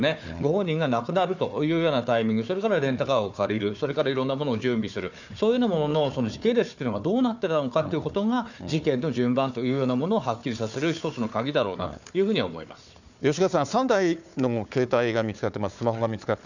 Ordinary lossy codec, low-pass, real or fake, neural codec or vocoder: none; 7.2 kHz; fake; codec, 16 kHz, 4 kbps, FunCodec, trained on Chinese and English, 50 frames a second